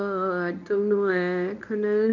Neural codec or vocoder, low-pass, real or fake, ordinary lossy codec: codec, 24 kHz, 0.9 kbps, WavTokenizer, medium speech release version 1; 7.2 kHz; fake; none